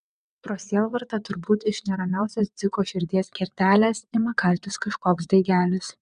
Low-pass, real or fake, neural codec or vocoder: 9.9 kHz; fake; vocoder, 22.05 kHz, 80 mel bands, WaveNeXt